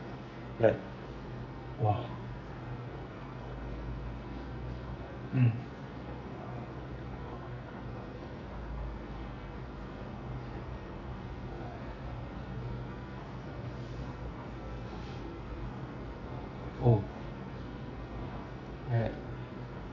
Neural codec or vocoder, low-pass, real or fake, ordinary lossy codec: codec, 44.1 kHz, 2.6 kbps, SNAC; 7.2 kHz; fake; none